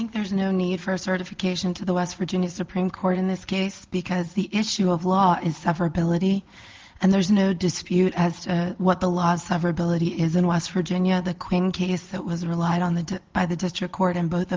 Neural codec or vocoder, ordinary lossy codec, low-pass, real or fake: none; Opus, 16 kbps; 7.2 kHz; real